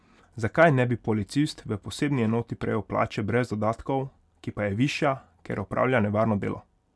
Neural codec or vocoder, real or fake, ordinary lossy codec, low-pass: none; real; none; none